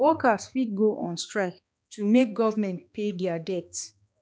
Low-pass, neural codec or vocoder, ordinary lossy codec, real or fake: none; codec, 16 kHz, 2 kbps, X-Codec, HuBERT features, trained on balanced general audio; none; fake